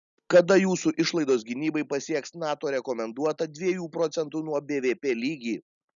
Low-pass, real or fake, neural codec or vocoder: 7.2 kHz; real; none